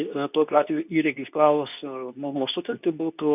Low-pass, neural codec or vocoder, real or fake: 3.6 kHz; codec, 24 kHz, 0.9 kbps, WavTokenizer, medium speech release version 2; fake